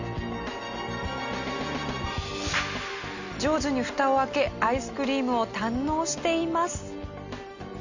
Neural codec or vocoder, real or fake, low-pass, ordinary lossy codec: none; real; 7.2 kHz; Opus, 64 kbps